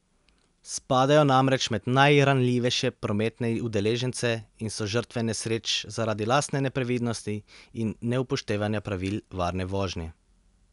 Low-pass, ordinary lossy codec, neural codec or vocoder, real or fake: 10.8 kHz; none; none; real